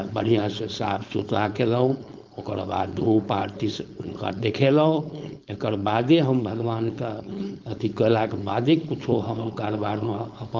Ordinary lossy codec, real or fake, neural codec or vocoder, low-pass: Opus, 32 kbps; fake; codec, 16 kHz, 4.8 kbps, FACodec; 7.2 kHz